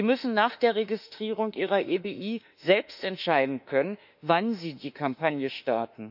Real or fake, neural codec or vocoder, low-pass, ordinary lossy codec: fake; autoencoder, 48 kHz, 32 numbers a frame, DAC-VAE, trained on Japanese speech; 5.4 kHz; none